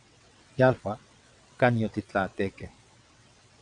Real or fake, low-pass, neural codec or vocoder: fake; 9.9 kHz; vocoder, 22.05 kHz, 80 mel bands, WaveNeXt